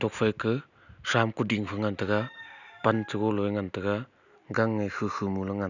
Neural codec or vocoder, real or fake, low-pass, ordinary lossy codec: none; real; 7.2 kHz; none